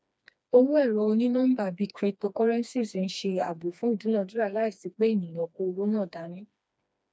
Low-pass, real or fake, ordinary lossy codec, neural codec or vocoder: none; fake; none; codec, 16 kHz, 2 kbps, FreqCodec, smaller model